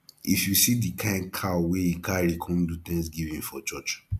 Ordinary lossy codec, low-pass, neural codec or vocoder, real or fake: none; 14.4 kHz; none; real